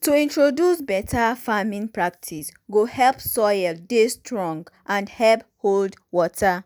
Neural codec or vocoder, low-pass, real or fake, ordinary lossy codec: none; none; real; none